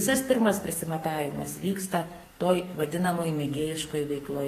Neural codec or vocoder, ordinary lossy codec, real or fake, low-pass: codec, 44.1 kHz, 2.6 kbps, SNAC; AAC, 48 kbps; fake; 14.4 kHz